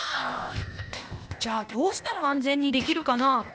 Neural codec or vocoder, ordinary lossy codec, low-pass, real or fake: codec, 16 kHz, 0.8 kbps, ZipCodec; none; none; fake